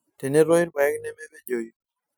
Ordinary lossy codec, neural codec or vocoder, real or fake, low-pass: none; none; real; none